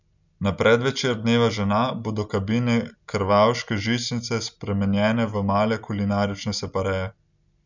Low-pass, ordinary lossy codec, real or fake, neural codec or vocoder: 7.2 kHz; none; real; none